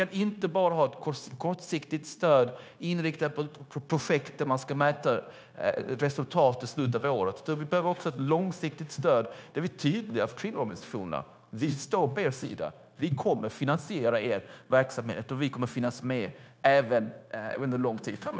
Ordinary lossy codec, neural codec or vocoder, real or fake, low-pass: none; codec, 16 kHz, 0.9 kbps, LongCat-Audio-Codec; fake; none